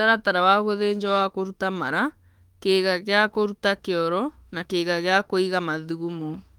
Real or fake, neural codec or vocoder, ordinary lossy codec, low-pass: fake; autoencoder, 48 kHz, 32 numbers a frame, DAC-VAE, trained on Japanese speech; Opus, 32 kbps; 19.8 kHz